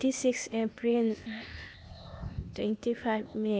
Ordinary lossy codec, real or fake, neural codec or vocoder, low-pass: none; fake; codec, 16 kHz, 0.8 kbps, ZipCodec; none